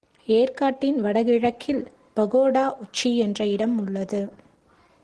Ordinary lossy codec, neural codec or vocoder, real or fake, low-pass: Opus, 16 kbps; none; real; 10.8 kHz